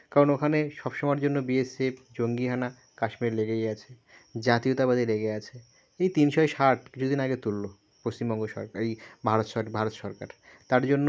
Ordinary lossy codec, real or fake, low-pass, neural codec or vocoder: none; real; none; none